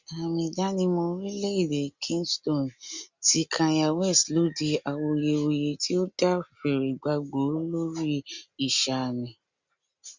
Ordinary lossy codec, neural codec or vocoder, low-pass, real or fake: Opus, 64 kbps; none; 7.2 kHz; real